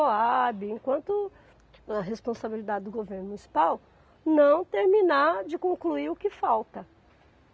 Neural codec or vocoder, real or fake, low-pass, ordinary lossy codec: none; real; none; none